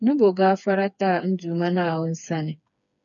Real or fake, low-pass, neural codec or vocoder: fake; 7.2 kHz; codec, 16 kHz, 4 kbps, FreqCodec, smaller model